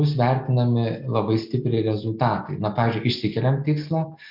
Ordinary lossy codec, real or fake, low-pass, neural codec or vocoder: MP3, 48 kbps; real; 5.4 kHz; none